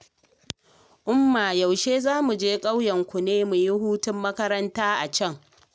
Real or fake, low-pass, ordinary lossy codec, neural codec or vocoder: real; none; none; none